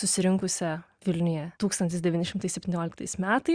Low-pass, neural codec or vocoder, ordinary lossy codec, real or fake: 9.9 kHz; none; Opus, 64 kbps; real